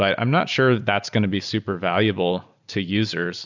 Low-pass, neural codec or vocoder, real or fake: 7.2 kHz; none; real